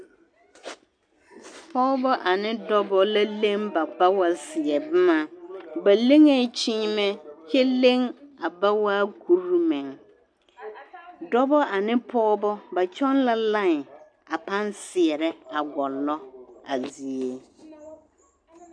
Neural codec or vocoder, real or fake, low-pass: none; real; 9.9 kHz